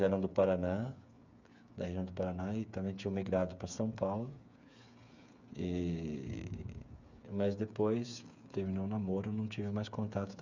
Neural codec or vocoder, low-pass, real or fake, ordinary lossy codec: codec, 16 kHz, 8 kbps, FreqCodec, smaller model; 7.2 kHz; fake; none